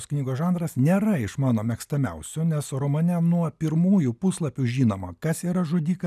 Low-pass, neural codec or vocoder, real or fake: 14.4 kHz; none; real